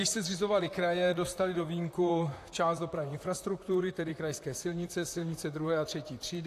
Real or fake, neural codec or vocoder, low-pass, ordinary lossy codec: fake; vocoder, 44.1 kHz, 128 mel bands, Pupu-Vocoder; 14.4 kHz; AAC, 64 kbps